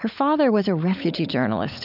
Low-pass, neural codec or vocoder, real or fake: 5.4 kHz; codec, 16 kHz, 16 kbps, FunCodec, trained on LibriTTS, 50 frames a second; fake